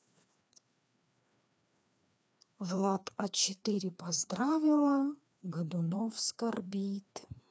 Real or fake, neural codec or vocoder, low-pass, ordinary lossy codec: fake; codec, 16 kHz, 2 kbps, FreqCodec, larger model; none; none